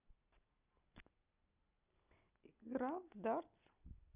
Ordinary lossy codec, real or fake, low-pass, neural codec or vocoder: none; real; 3.6 kHz; none